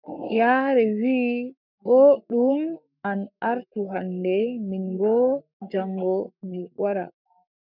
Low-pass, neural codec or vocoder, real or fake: 5.4 kHz; codec, 44.1 kHz, 7.8 kbps, Pupu-Codec; fake